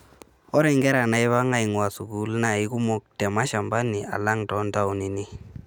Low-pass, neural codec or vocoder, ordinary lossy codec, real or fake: none; none; none; real